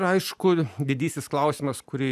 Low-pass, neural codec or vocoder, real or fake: 14.4 kHz; none; real